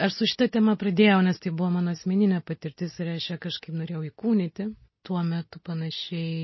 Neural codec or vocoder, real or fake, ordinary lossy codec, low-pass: none; real; MP3, 24 kbps; 7.2 kHz